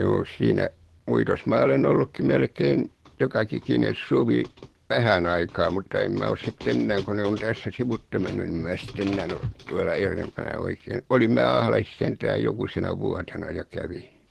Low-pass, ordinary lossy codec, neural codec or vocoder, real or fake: 14.4 kHz; Opus, 16 kbps; codec, 44.1 kHz, 7.8 kbps, DAC; fake